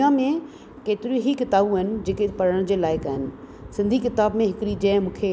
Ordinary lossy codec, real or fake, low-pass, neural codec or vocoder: none; real; none; none